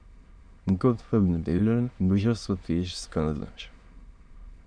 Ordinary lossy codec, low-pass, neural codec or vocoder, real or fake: Opus, 64 kbps; 9.9 kHz; autoencoder, 22.05 kHz, a latent of 192 numbers a frame, VITS, trained on many speakers; fake